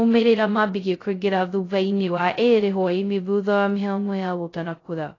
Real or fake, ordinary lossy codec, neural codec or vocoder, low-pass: fake; AAC, 32 kbps; codec, 16 kHz, 0.2 kbps, FocalCodec; 7.2 kHz